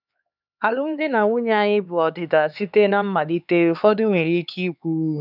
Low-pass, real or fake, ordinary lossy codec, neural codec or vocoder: 5.4 kHz; fake; none; codec, 16 kHz, 4 kbps, X-Codec, HuBERT features, trained on LibriSpeech